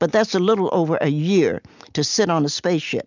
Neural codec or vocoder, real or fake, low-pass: none; real; 7.2 kHz